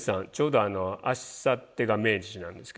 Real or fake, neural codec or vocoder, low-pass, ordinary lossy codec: real; none; none; none